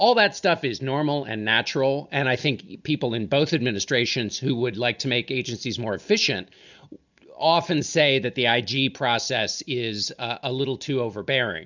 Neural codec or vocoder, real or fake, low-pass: none; real; 7.2 kHz